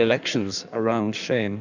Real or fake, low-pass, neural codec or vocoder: fake; 7.2 kHz; codec, 16 kHz in and 24 kHz out, 1.1 kbps, FireRedTTS-2 codec